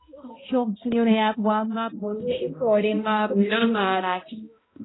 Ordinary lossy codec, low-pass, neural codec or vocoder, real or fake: AAC, 16 kbps; 7.2 kHz; codec, 16 kHz, 0.5 kbps, X-Codec, HuBERT features, trained on balanced general audio; fake